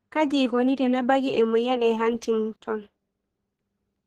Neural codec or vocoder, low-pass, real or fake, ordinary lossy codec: codec, 32 kHz, 1.9 kbps, SNAC; 14.4 kHz; fake; Opus, 16 kbps